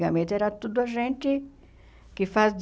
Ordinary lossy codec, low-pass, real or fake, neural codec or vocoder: none; none; real; none